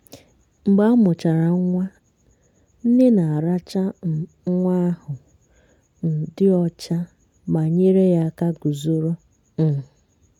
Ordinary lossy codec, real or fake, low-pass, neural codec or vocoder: none; real; 19.8 kHz; none